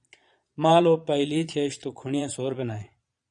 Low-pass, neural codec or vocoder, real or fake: 9.9 kHz; vocoder, 22.05 kHz, 80 mel bands, Vocos; fake